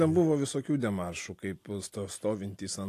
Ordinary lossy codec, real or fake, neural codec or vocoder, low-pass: AAC, 64 kbps; real; none; 14.4 kHz